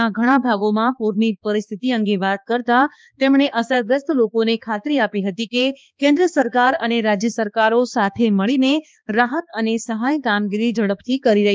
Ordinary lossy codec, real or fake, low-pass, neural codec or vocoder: none; fake; none; codec, 16 kHz, 2 kbps, X-Codec, HuBERT features, trained on balanced general audio